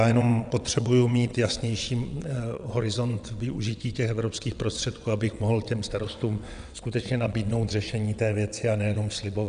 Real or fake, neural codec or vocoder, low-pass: fake; vocoder, 22.05 kHz, 80 mel bands, WaveNeXt; 9.9 kHz